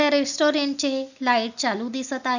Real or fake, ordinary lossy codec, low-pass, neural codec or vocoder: real; none; 7.2 kHz; none